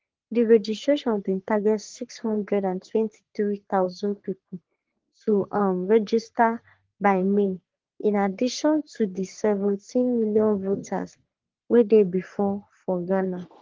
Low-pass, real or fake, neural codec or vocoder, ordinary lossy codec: 7.2 kHz; fake; codec, 44.1 kHz, 3.4 kbps, Pupu-Codec; Opus, 16 kbps